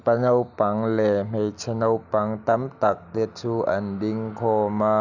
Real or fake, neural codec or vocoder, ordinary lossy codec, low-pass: real; none; none; 7.2 kHz